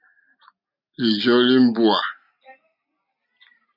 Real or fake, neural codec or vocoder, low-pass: real; none; 5.4 kHz